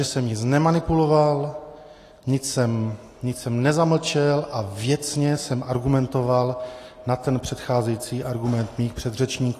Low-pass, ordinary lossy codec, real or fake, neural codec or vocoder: 14.4 kHz; AAC, 48 kbps; real; none